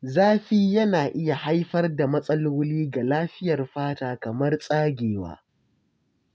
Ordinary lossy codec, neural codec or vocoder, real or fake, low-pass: none; none; real; none